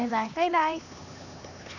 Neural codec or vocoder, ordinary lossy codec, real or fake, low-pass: codec, 16 kHz, 2 kbps, X-Codec, HuBERT features, trained on LibriSpeech; none; fake; 7.2 kHz